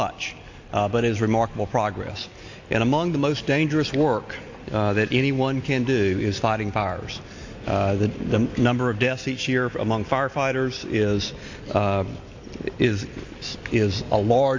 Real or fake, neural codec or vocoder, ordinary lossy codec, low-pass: real; none; AAC, 48 kbps; 7.2 kHz